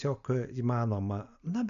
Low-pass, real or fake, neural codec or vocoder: 7.2 kHz; real; none